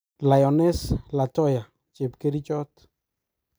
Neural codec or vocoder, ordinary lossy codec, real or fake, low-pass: none; none; real; none